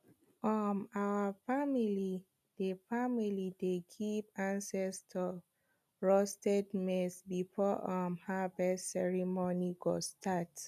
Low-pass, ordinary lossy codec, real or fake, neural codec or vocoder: 14.4 kHz; none; real; none